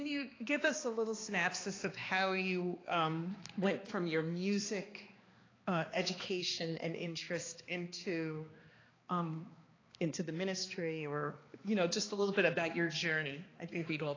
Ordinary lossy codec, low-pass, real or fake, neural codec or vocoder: AAC, 32 kbps; 7.2 kHz; fake; codec, 16 kHz, 2 kbps, X-Codec, HuBERT features, trained on balanced general audio